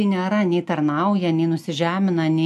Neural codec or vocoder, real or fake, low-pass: none; real; 14.4 kHz